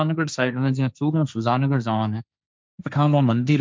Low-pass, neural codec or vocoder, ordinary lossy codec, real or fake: none; codec, 16 kHz, 1.1 kbps, Voila-Tokenizer; none; fake